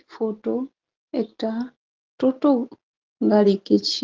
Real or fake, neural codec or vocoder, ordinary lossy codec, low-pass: real; none; Opus, 16 kbps; 7.2 kHz